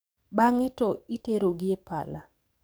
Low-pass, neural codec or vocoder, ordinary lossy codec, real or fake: none; codec, 44.1 kHz, 7.8 kbps, DAC; none; fake